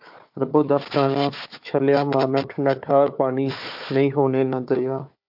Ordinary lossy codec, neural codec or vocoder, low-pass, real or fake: MP3, 48 kbps; codec, 16 kHz, 4 kbps, FunCodec, trained on Chinese and English, 50 frames a second; 5.4 kHz; fake